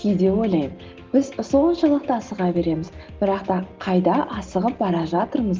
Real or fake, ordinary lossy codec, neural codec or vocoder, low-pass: real; Opus, 16 kbps; none; 7.2 kHz